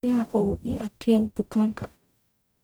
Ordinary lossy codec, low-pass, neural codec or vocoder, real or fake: none; none; codec, 44.1 kHz, 0.9 kbps, DAC; fake